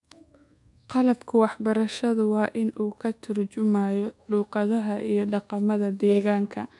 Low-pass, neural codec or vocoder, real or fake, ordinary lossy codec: 10.8 kHz; codec, 24 kHz, 1.2 kbps, DualCodec; fake; none